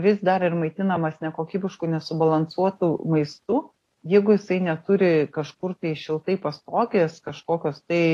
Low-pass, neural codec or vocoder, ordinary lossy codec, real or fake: 14.4 kHz; none; AAC, 48 kbps; real